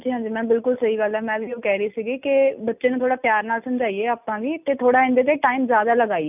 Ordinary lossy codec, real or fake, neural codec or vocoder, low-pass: none; real; none; 3.6 kHz